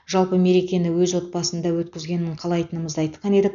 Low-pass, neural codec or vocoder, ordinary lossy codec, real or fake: 7.2 kHz; none; none; real